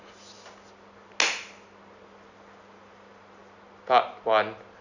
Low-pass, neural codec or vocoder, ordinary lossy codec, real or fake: 7.2 kHz; none; none; real